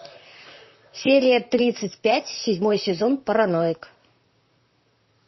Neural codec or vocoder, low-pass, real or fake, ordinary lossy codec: vocoder, 44.1 kHz, 128 mel bands, Pupu-Vocoder; 7.2 kHz; fake; MP3, 24 kbps